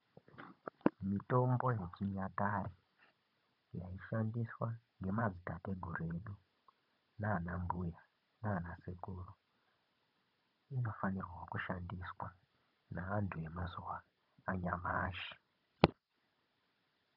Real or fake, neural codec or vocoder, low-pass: fake; codec, 16 kHz, 16 kbps, FunCodec, trained on Chinese and English, 50 frames a second; 5.4 kHz